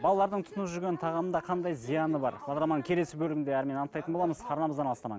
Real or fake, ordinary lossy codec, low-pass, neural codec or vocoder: real; none; none; none